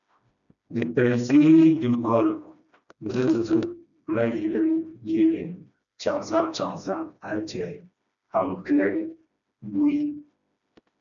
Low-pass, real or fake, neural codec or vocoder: 7.2 kHz; fake; codec, 16 kHz, 1 kbps, FreqCodec, smaller model